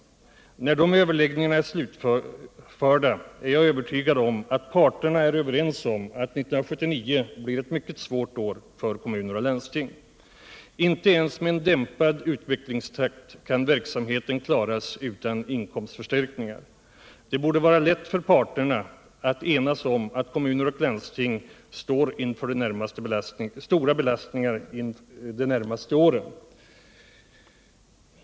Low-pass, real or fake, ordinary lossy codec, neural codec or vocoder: none; real; none; none